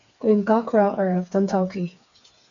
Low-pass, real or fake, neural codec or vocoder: 7.2 kHz; fake; codec, 16 kHz, 4 kbps, FreqCodec, smaller model